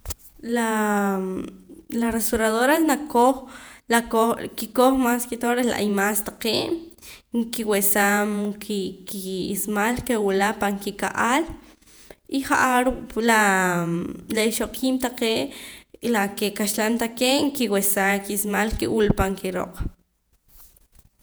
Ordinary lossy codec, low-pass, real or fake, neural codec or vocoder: none; none; fake; vocoder, 48 kHz, 128 mel bands, Vocos